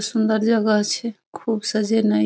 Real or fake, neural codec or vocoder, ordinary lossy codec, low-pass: real; none; none; none